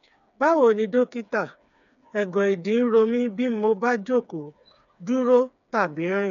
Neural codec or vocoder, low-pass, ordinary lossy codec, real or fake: codec, 16 kHz, 4 kbps, FreqCodec, smaller model; 7.2 kHz; none; fake